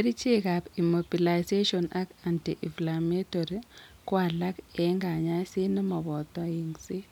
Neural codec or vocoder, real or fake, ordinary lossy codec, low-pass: none; real; none; 19.8 kHz